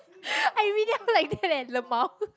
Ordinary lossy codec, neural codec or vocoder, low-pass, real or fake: none; none; none; real